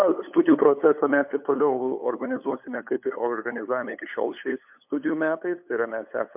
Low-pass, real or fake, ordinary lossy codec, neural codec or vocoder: 3.6 kHz; fake; MP3, 32 kbps; codec, 16 kHz, 8 kbps, FunCodec, trained on LibriTTS, 25 frames a second